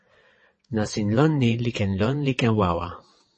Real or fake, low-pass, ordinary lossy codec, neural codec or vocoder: fake; 9.9 kHz; MP3, 32 kbps; vocoder, 22.05 kHz, 80 mel bands, WaveNeXt